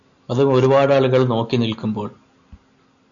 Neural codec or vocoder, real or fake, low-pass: none; real; 7.2 kHz